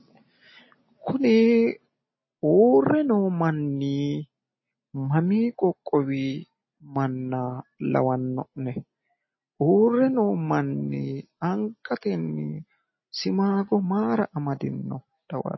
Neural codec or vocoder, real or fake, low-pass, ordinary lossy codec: none; real; 7.2 kHz; MP3, 24 kbps